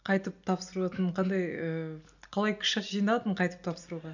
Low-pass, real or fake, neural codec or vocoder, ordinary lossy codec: 7.2 kHz; real; none; none